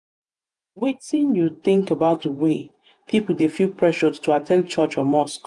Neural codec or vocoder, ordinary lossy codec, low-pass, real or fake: vocoder, 48 kHz, 128 mel bands, Vocos; MP3, 96 kbps; 10.8 kHz; fake